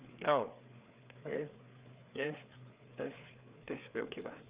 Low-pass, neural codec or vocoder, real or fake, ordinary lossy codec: 3.6 kHz; codec, 16 kHz, 4 kbps, FreqCodec, larger model; fake; Opus, 64 kbps